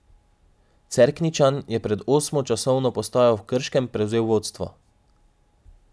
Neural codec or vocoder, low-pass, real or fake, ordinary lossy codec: none; none; real; none